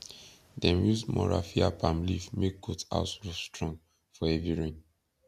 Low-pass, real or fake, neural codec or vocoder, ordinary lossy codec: 14.4 kHz; real; none; none